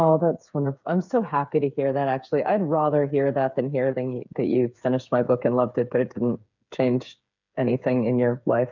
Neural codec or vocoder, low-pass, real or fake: codec, 16 kHz, 8 kbps, FreqCodec, smaller model; 7.2 kHz; fake